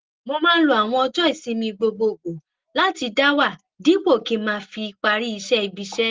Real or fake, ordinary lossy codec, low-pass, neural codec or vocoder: real; Opus, 16 kbps; 7.2 kHz; none